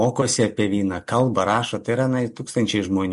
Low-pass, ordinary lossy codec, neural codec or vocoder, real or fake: 14.4 kHz; MP3, 48 kbps; none; real